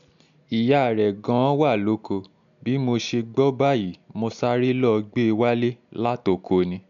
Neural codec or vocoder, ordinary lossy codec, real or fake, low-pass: none; none; real; 7.2 kHz